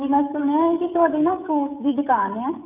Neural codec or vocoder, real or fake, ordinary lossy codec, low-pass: codec, 16 kHz, 16 kbps, FreqCodec, smaller model; fake; none; 3.6 kHz